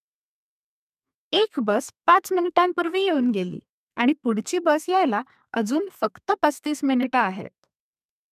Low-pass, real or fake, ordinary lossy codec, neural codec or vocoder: 14.4 kHz; fake; none; codec, 32 kHz, 1.9 kbps, SNAC